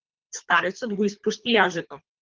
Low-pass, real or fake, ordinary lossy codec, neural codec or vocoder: 7.2 kHz; fake; Opus, 24 kbps; codec, 24 kHz, 3 kbps, HILCodec